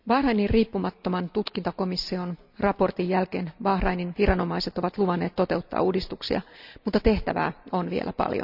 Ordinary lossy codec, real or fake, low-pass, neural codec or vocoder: none; real; 5.4 kHz; none